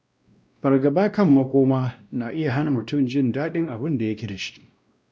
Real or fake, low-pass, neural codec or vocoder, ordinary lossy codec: fake; none; codec, 16 kHz, 1 kbps, X-Codec, WavLM features, trained on Multilingual LibriSpeech; none